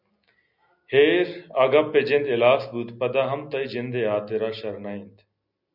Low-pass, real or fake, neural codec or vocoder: 5.4 kHz; real; none